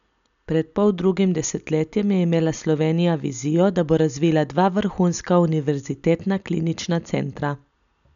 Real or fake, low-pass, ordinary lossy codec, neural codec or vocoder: real; 7.2 kHz; MP3, 96 kbps; none